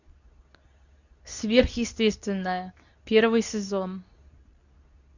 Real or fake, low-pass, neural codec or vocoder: fake; 7.2 kHz; codec, 24 kHz, 0.9 kbps, WavTokenizer, medium speech release version 2